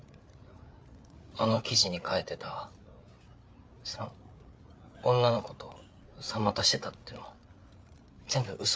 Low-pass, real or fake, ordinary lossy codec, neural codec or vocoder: none; fake; none; codec, 16 kHz, 8 kbps, FreqCodec, larger model